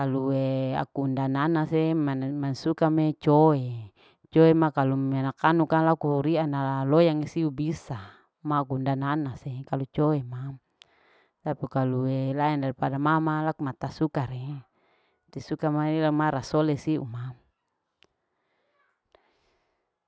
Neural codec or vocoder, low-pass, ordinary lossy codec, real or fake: none; none; none; real